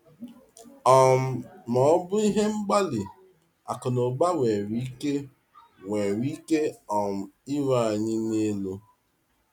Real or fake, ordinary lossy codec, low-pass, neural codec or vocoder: real; none; 19.8 kHz; none